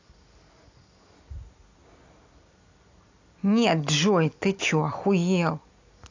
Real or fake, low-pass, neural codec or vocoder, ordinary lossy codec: real; 7.2 kHz; none; AAC, 48 kbps